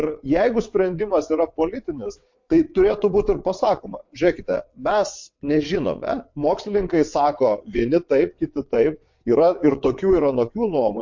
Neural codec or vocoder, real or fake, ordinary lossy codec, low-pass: vocoder, 22.05 kHz, 80 mel bands, WaveNeXt; fake; MP3, 48 kbps; 7.2 kHz